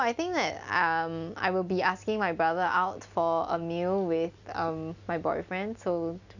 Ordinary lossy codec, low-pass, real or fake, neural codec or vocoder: none; 7.2 kHz; real; none